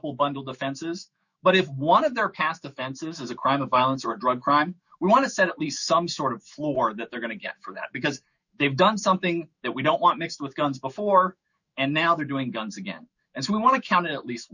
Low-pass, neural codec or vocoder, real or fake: 7.2 kHz; autoencoder, 48 kHz, 128 numbers a frame, DAC-VAE, trained on Japanese speech; fake